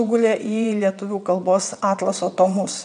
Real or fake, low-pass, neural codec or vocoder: fake; 9.9 kHz; vocoder, 22.05 kHz, 80 mel bands, WaveNeXt